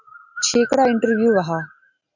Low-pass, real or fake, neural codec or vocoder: 7.2 kHz; real; none